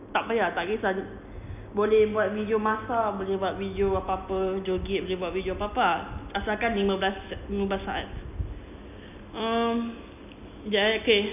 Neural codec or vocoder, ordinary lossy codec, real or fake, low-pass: none; none; real; 3.6 kHz